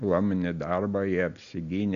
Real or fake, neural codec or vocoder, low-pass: real; none; 7.2 kHz